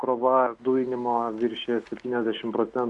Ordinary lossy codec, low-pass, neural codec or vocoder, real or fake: Opus, 64 kbps; 10.8 kHz; none; real